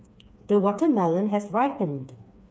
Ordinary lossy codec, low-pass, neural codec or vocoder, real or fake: none; none; codec, 16 kHz, 4 kbps, FreqCodec, smaller model; fake